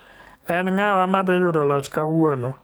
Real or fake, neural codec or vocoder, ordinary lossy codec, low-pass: fake; codec, 44.1 kHz, 2.6 kbps, SNAC; none; none